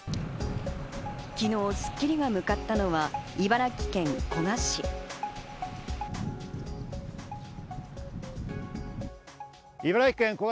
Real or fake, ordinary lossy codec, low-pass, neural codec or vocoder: real; none; none; none